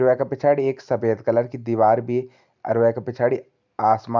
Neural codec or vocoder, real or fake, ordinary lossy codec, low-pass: none; real; none; 7.2 kHz